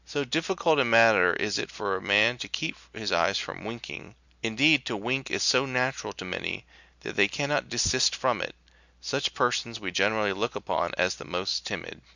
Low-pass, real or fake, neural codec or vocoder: 7.2 kHz; real; none